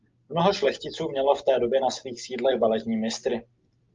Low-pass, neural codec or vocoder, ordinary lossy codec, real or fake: 7.2 kHz; none; Opus, 24 kbps; real